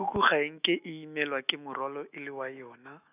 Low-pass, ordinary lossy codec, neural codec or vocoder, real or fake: 3.6 kHz; none; autoencoder, 48 kHz, 128 numbers a frame, DAC-VAE, trained on Japanese speech; fake